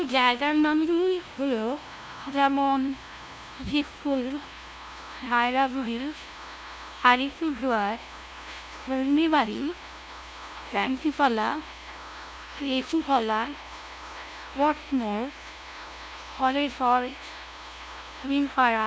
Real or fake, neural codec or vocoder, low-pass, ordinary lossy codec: fake; codec, 16 kHz, 0.5 kbps, FunCodec, trained on LibriTTS, 25 frames a second; none; none